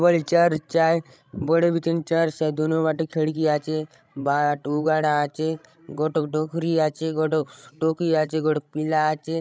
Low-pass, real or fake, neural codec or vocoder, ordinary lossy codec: none; fake; codec, 16 kHz, 16 kbps, FreqCodec, larger model; none